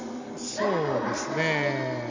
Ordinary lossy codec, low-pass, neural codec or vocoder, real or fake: AAC, 48 kbps; 7.2 kHz; none; real